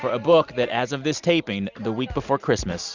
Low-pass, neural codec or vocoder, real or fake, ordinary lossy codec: 7.2 kHz; none; real; Opus, 64 kbps